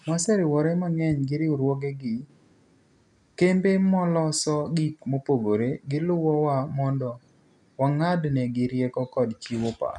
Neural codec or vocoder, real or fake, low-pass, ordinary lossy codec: none; real; 10.8 kHz; none